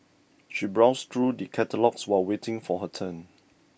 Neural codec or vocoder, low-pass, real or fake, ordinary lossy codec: none; none; real; none